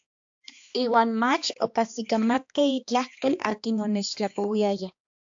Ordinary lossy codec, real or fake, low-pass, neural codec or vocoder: AAC, 64 kbps; fake; 7.2 kHz; codec, 16 kHz, 2 kbps, X-Codec, HuBERT features, trained on balanced general audio